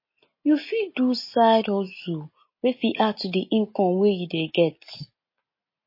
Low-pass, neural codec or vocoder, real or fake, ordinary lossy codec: 5.4 kHz; none; real; MP3, 24 kbps